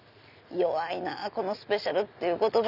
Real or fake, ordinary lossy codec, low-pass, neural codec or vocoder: real; none; 5.4 kHz; none